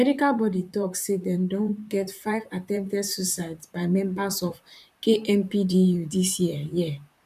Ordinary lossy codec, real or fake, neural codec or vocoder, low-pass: none; fake; vocoder, 44.1 kHz, 128 mel bands, Pupu-Vocoder; 14.4 kHz